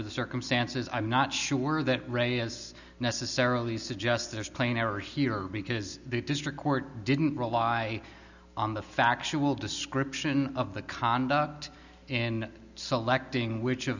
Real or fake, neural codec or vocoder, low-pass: real; none; 7.2 kHz